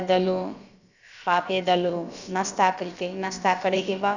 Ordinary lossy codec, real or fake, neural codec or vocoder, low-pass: none; fake; codec, 16 kHz, about 1 kbps, DyCAST, with the encoder's durations; 7.2 kHz